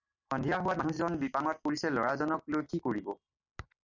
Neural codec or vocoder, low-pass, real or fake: none; 7.2 kHz; real